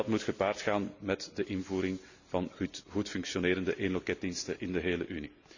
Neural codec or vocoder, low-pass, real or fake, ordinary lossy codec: none; 7.2 kHz; real; none